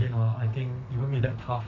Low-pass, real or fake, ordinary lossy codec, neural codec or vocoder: 7.2 kHz; fake; none; codec, 32 kHz, 1.9 kbps, SNAC